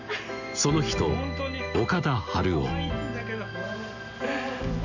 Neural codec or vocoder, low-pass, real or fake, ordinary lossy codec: none; 7.2 kHz; real; none